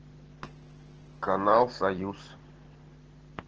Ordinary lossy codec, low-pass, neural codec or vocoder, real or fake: Opus, 16 kbps; 7.2 kHz; none; real